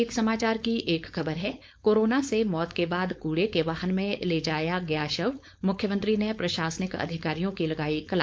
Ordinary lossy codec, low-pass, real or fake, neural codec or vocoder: none; none; fake; codec, 16 kHz, 4.8 kbps, FACodec